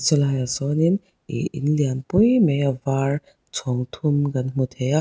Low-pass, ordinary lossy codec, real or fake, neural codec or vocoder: none; none; real; none